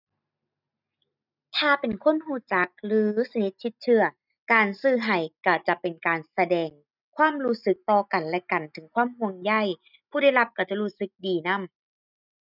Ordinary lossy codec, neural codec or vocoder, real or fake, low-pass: none; none; real; 5.4 kHz